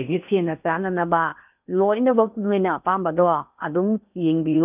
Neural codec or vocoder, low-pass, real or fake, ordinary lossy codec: codec, 16 kHz in and 24 kHz out, 0.8 kbps, FocalCodec, streaming, 65536 codes; 3.6 kHz; fake; none